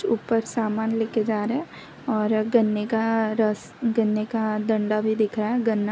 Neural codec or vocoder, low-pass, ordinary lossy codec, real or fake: none; none; none; real